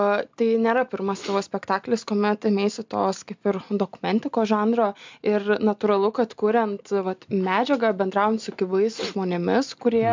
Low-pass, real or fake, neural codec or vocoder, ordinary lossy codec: 7.2 kHz; real; none; MP3, 64 kbps